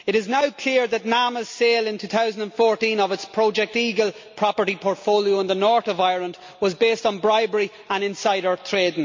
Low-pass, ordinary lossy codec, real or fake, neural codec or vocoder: 7.2 kHz; MP3, 48 kbps; real; none